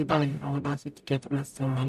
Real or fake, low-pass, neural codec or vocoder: fake; 14.4 kHz; codec, 44.1 kHz, 0.9 kbps, DAC